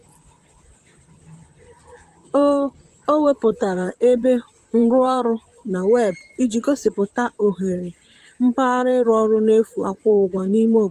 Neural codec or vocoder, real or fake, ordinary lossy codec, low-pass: vocoder, 44.1 kHz, 128 mel bands, Pupu-Vocoder; fake; Opus, 32 kbps; 14.4 kHz